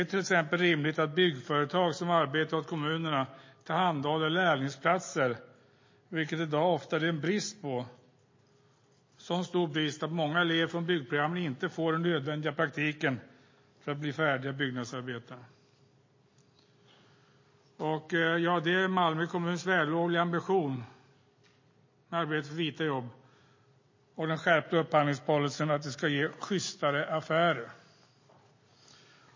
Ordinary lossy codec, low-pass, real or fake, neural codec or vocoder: MP3, 32 kbps; 7.2 kHz; real; none